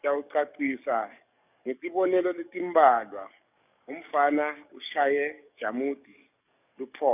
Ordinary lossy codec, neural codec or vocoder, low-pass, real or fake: none; codec, 44.1 kHz, 7.8 kbps, DAC; 3.6 kHz; fake